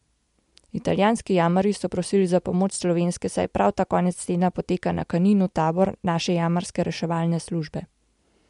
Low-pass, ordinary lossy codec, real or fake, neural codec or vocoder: 10.8 kHz; MP3, 64 kbps; real; none